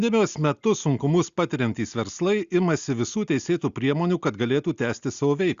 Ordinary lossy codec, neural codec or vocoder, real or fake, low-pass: Opus, 64 kbps; none; real; 7.2 kHz